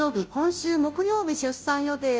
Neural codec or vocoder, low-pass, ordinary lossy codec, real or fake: codec, 16 kHz, 0.5 kbps, FunCodec, trained on Chinese and English, 25 frames a second; none; none; fake